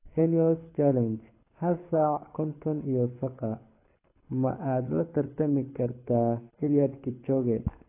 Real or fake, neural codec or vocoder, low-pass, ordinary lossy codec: fake; codec, 24 kHz, 6 kbps, HILCodec; 3.6 kHz; AAC, 24 kbps